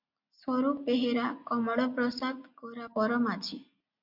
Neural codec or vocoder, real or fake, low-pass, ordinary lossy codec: none; real; 5.4 kHz; MP3, 48 kbps